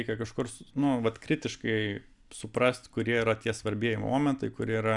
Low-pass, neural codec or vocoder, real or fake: 10.8 kHz; none; real